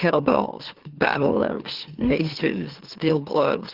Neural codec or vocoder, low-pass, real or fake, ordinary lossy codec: autoencoder, 44.1 kHz, a latent of 192 numbers a frame, MeloTTS; 5.4 kHz; fake; Opus, 16 kbps